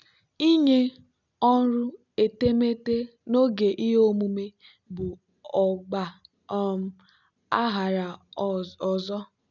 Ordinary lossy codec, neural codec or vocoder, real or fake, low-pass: none; none; real; 7.2 kHz